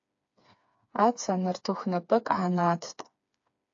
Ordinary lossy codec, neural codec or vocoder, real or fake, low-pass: AAC, 48 kbps; codec, 16 kHz, 4 kbps, FreqCodec, smaller model; fake; 7.2 kHz